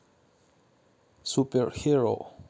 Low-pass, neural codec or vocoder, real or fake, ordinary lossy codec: none; none; real; none